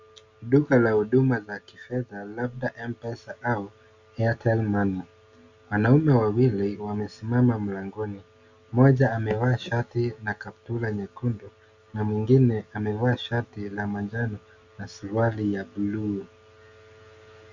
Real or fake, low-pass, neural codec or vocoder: real; 7.2 kHz; none